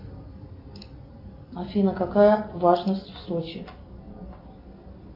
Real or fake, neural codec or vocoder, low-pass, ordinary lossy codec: real; none; 5.4 kHz; AAC, 32 kbps